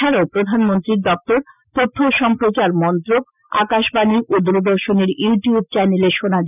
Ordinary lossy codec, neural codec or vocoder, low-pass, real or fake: none; none; 3.6 kHz; real